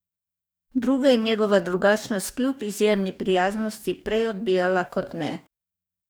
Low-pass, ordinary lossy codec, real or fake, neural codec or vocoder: none; none; fake; codec, 44.1 kHz, 2.6 kbps, DAC